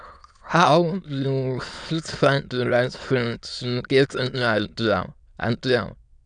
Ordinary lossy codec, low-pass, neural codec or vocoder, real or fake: none; 9.9 kHz; autoencoder, 22.05 kHz, a latent of 192 numbers a frame, VITS, trained on many speakers; fake